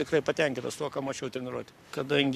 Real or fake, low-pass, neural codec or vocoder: fake; 14.4 kHz; codec, 44.1 kHz, 7.8 kbps, Pupu-Codec